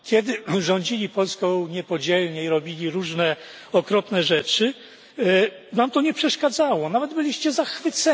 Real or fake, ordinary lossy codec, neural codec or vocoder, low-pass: real; none; none; none